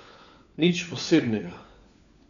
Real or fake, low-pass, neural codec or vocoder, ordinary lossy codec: fake; 7.2 kHz; codec, 16 kHz, 4 kbps, FunCodec, trained on LibriTTS, 50 frames a second; none